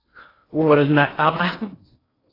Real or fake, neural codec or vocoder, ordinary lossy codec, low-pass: fake; codec, 16 kHz in and 24 kHz out, 0.6 kbps, FocalCodec, streaming, 4096 codes; AAC, 24 kbps; 5.4 kHz